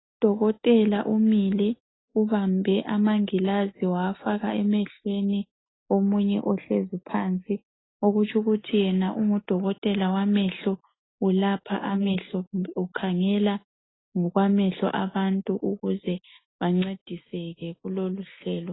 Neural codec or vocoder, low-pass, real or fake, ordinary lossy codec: none; 7.2 kHz; real; AAC, 16 kbps